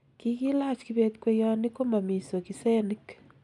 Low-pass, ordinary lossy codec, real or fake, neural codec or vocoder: 10.8 kHz; none; real; none